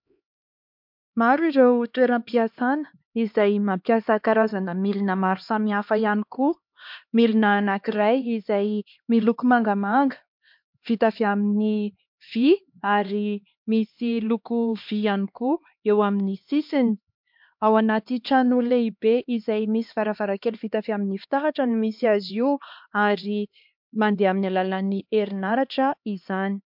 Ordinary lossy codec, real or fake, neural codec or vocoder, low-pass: MP3, 48 kbps; fake; codec, 16 kHz, 2 kbps, X-Codec, HuBERT features, trained on LibriSpeech; 5.4 kHz